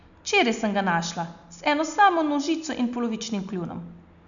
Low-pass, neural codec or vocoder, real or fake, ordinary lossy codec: 7.2 kHz; none; real; MP3, 96 kbps